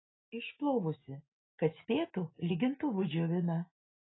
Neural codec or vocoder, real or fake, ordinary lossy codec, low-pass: none; real; AAC, 16 kbps; 7.2 kHz